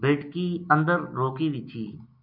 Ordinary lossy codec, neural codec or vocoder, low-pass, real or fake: MP3, 48 kbps; none; 5.4 kHz; real